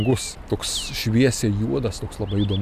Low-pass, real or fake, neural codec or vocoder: 14.4 kHz; real; none